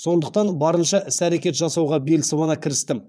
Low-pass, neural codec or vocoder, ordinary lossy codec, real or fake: none; vocoder, 22.05 kHz, 80 mel bands, Vocos; none; fake